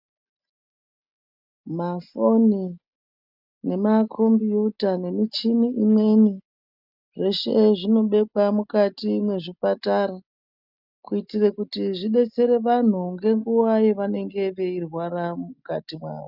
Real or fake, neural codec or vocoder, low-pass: real; none; 5.4 kHz